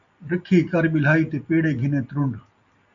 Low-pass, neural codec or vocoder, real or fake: 7.2 kHz; none; real